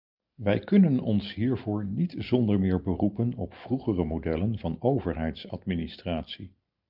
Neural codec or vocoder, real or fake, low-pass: none; real; 5.4 kHz